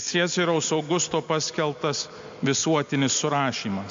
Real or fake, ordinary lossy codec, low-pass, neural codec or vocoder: real; MP3, 48 kbps; 7.2 kHz; none